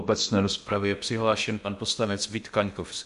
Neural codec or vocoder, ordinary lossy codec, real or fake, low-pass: codec, 16 kHz in and 24 kHz out, 0.6 kbps, FocalCodec, streaming, 2048 codes; MP3, 64 kbps; fake; 10.8 kHz